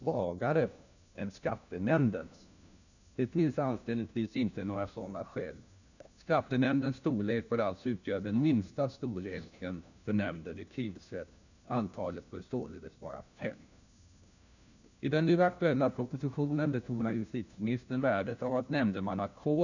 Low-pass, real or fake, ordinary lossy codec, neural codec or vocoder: 7.2 kHz; fake; none; codec, 16 kHz, 1 kbps, FunCodec, trained on LibriTTS, 50 frames a second